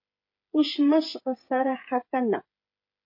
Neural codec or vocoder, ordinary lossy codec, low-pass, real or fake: codec, 16 kHz, 8 kbps, FreqCodec, smaller model; MP3, 32 kbps; 5.4 kHz; fake